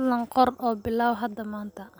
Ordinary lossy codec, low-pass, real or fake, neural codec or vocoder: none; none; real; none